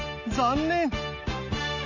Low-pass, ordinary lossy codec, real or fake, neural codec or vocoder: 7.2 kHz; none; real; none